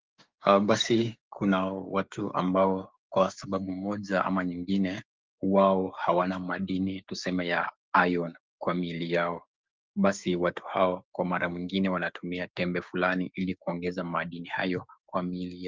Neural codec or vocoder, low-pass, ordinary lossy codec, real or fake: codec, 16 kHz, 6 kbps, DAC; 7.2 kHz; Opus, 24 kbps; fake